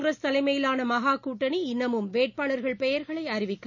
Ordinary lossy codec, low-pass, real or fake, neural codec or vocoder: none; 7.2 kHz; real; none